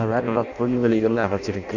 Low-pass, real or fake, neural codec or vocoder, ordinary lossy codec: 7.2 kHz; fake; codec, 16 kHz in and 24 kHz out, 0.6 kbps, FireRedTTS-2 codec; none